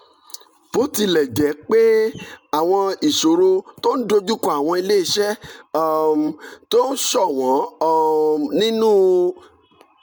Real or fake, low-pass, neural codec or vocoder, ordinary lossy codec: real; none; none; none